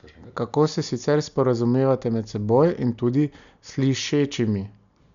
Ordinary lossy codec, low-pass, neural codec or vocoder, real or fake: none; 7.2 kHz; none; real